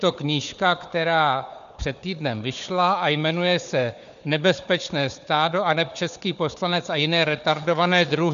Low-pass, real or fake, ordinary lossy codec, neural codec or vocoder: 7.2 kHz; fake; MP3, 96 kbps; codec, 16 kHz, 4 kbps, FunCodec, trained on Chinese and English, 50 frames a second